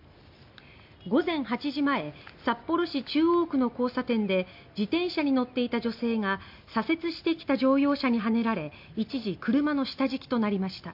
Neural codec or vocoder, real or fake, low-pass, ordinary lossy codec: none; real; 5.4 kHz; MP3, 48 kbps